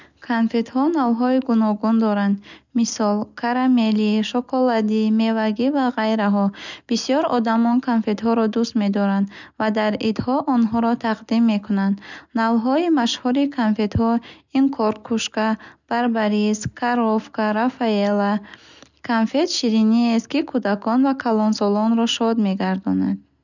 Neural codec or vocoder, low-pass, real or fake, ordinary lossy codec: none; 7.2 kHz; real; none